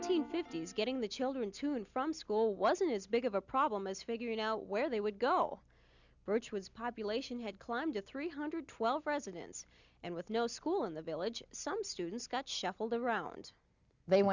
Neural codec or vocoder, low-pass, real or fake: none; 7.2 kHz; real